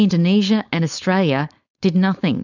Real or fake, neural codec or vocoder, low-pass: fake; codec, 16 kHz, 4.8 kbps, FACodec; 7.2 kHz